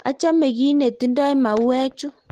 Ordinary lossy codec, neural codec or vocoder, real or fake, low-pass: Opus, 16 kbps; none; real; 14.4 kHz